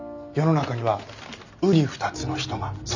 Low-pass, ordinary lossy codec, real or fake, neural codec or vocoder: 7.2 kHz; none; real; none